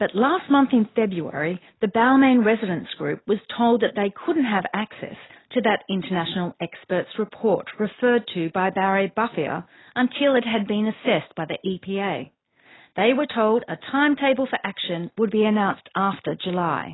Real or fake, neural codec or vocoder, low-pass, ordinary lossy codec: real; none; 7.2 kHz; AAC, 16 kbps